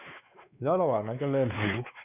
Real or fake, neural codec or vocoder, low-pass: fake; codec, 16 kHz, 4 kbps, X-Codec, WavLM features, trained on Multilingual LibriSpeech; 3.6 kHz